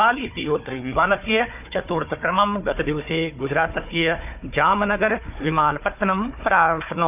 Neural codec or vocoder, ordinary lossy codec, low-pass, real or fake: codec, 16 kHz, 4 kbps, FunCodec, trained on Chinese and English, 50 frames a second; none; 3.6 kHz; fake